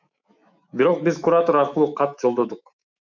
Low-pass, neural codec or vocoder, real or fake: 7.2 kHz; autoencoder, 48 kHz, 128 numbers a frame, DAC-VAE, trained on Japanese speech; fake